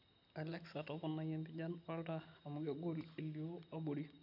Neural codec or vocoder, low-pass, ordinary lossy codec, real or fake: none; 5.4 kHz; MP3, 48 kbps; real